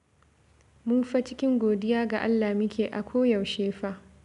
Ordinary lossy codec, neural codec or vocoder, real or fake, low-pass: none; none; real; 10.8 kHz